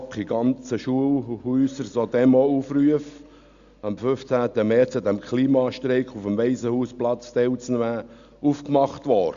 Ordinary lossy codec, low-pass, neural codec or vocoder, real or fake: Opus, 64 kbps; 7.2 kHz; none; real